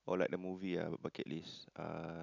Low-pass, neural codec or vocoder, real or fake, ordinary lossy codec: 7.2 kHz; none; real; none